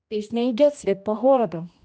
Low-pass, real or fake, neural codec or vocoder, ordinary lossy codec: none; fake; codec, 16 kHz, 1 kbps, X-Codec, HuBERT features, trained on general audio; none